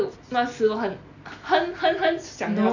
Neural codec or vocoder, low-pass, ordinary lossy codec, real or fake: none; 7.2 kHz; none; real